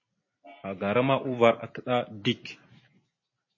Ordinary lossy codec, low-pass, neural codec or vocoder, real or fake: MP3, 32 kbps; 7.2 kHz; vocoder, 44.1 kHz, 128 mel bands every 256 samples, BigVGAN v2; fake